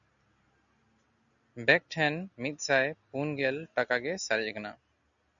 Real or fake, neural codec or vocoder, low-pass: real; none; 7.2 kHz